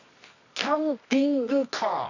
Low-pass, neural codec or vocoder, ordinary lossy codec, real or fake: 7.2 kHz; codec, 24 kHz, 0.9 kbps, WavTokenizer, medium music audio release; none; fake